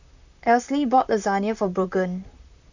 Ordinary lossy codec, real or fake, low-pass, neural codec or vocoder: none; fake; 7.2 kHz; vocoder, 44.1 kHz, 128 mel bands, Pupu-Vocoder